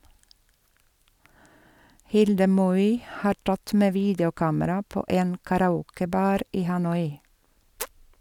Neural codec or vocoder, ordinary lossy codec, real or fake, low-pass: none; none; real; 19.8 kHz